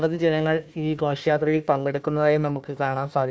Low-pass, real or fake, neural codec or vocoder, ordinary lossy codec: none; fake; codec, 16 kHz, 1 kbps, FunCodec, trained on Chinese and English, 50 frames a second; none